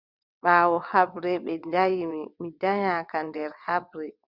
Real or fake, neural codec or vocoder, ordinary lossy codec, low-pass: fake; vocoder, 22.05 kHz, 80 mel bands, WaveNeXt; Opus, 64 kbps; 5.4 kHz